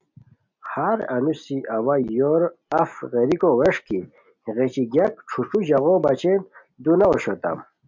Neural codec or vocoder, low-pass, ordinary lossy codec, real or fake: none; 7.2 kHz; MP3, 64 kbps; real